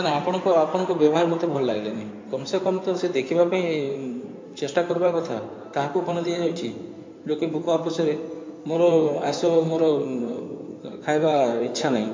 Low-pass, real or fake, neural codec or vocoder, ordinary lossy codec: 7.2 kHz; fake; codec, 16 kHz in and 24 kHz out, 2.2 kbps, FireRedTTS-2 codec; MP3, 48 kbps